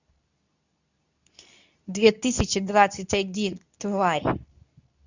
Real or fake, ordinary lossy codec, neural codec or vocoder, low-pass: fake; none; codec, 24 kHz, 0.9 kbps, WavTokenizer, medium speech release version 2; 7.2 kHz